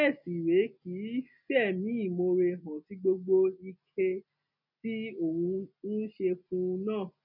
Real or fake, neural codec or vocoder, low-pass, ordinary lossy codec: real; none; 5.4 kHz; none